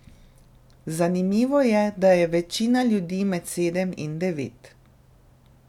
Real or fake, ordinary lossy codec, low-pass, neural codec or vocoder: real; none; 19.8 kHz; none